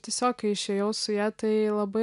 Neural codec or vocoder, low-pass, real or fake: none; 10.8 kHz; real